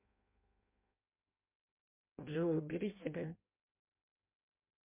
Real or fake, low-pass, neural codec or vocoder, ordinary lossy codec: fake; 3.6 kHz; codec, 16 kHz in and 24 kHz out, 0.6 kbps, FireRedTTS-2 codec; MP3, 32 kbps